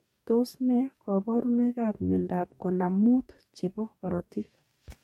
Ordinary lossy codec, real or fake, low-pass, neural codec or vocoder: MP3, 64 kbps; fake; 19.8 kHz; codec, 44.1 kHz, 2.6 kbps, DAC